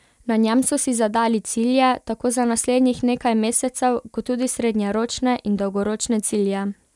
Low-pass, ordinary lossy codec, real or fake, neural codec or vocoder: none; none; real; none